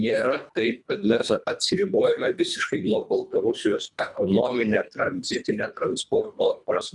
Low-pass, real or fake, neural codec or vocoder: 10.8 kHz; fake; codec, 24 kHz, 1.5 kbps, HILCodec